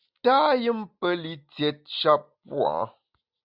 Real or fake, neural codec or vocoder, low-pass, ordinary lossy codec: real; none; 5.4 kHz; Opus, 64 kbps